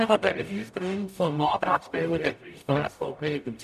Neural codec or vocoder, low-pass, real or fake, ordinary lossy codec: codec, 44.1 kHz, 0.9 kbps, DAC; 14.4 kHz; fake; none